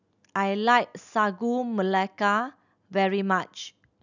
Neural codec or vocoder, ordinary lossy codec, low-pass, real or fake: none; none; 7.2 kHz; real